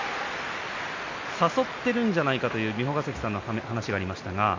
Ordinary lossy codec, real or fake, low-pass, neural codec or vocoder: MP3, 48 kbps; real; 7.2 kHz; none